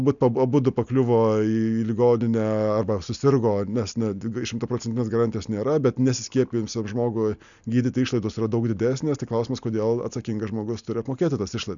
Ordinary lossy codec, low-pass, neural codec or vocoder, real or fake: MP3, 96 kbps; 7.2 kHz; none; real